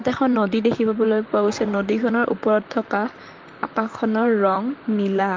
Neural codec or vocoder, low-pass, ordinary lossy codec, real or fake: vocoder, 44.1 kHz, 128 mel bands, Pupu-Vocoder; 7.2 kHz; Opus, 32 kbps; fake